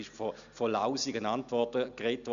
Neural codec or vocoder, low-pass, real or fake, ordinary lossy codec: none; 7.2 kHz; real; none